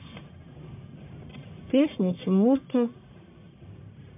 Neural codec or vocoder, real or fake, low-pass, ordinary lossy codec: codec, 44.1 kHz, 1.7 kbps, Pupu-Codec; fake; 3.6 kHz; MP3, 32 kbps